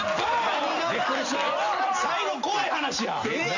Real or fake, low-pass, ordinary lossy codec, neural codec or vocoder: real; 7.2 kHz; none; none